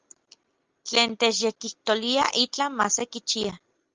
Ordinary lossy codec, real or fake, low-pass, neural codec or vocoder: Opus, 24 kbps; real; 7.2 kHz; none